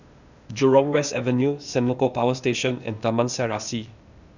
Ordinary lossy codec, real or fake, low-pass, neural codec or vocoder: none; fake; 7.2 kHz; codec, 16 kHz, 0.8 kbps, ZipCodec